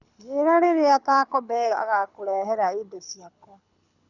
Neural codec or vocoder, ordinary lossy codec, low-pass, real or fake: codec, 24 kHz, 6 kbps, HILCodec; none; 7.2 kHz; fake